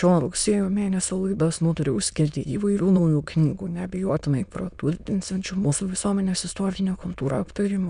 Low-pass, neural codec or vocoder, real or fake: 9.9 kHz; autoencoder, 22.05 kHz, a latent of 192 numbers a frame, VITS, trained on many speakers; fake